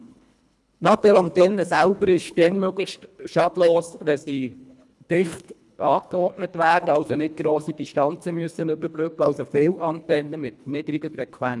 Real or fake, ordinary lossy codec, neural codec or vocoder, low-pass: fake; none; codec, 24 kHz, 1.5 kbps, HILCodec; none